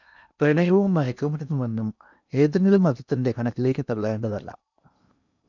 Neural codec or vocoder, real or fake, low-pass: codec, 16 kHz in and 24 kHz out, 0.8 kbps, FocalCodec, streaming, 65536 codes; fake; 7.2 kHz